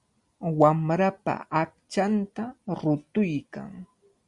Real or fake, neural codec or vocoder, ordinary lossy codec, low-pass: real; none; Opus, 64 kbps; 10.8 kHz